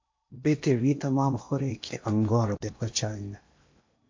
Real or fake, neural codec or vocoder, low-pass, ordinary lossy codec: fake; codec, 16 kHz in and 24 kHz out, 0.8 kbps, FocalCodec, streaming, 65536 codes; 7.2 kHz; MP3, 48 kbps